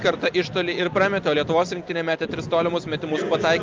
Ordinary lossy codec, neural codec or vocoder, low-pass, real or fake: Opus, 24 kbps; none; 7.2 kHz; real